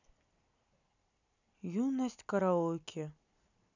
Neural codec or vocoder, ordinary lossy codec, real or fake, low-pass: none; none; real; 7.2 kHz